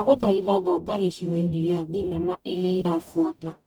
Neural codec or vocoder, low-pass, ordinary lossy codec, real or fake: codec, 44.1 kHz, 0.9 kbps, DAC; none; none; fake